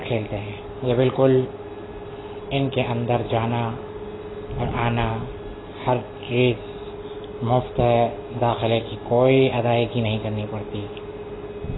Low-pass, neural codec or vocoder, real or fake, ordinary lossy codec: 7.2 kHz; none; real; AAC, 16 kbps